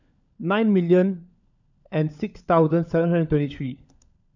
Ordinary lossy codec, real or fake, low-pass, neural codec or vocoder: none; fake; 7.2 kHz; codec, 16 kHz, 16 kbps, FunCodec, trained on LibriTTS, 50 frames a second